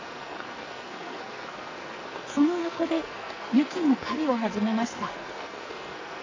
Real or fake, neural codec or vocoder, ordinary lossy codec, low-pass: fake; codec, 44.1 kHz, 2.6 kbps, SNAC; MP3, 48 kbps; 7.2 kHz